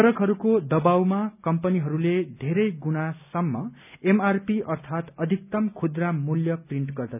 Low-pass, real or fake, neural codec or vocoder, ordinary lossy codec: 3.6 kHz; real; none; none